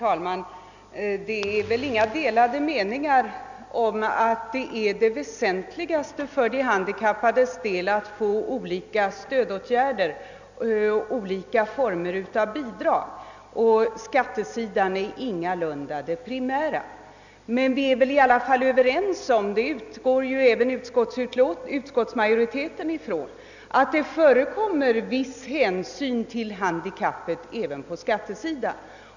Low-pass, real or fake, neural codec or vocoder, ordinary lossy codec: 7.2 kHz; real; none; none